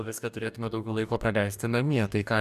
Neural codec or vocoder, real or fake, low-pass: codec, 44.1 kHz, 2.6 kbps, DAC; fake; 14.4 kHz